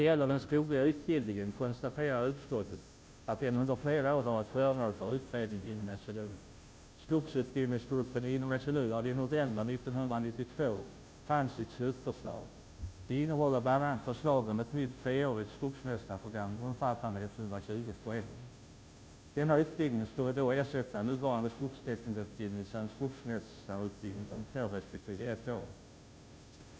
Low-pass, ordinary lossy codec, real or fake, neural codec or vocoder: none; none; fake; codec, 16 kHz, 0.5 kbps, FunCodec, trained on Chinese and English, 25 frames a second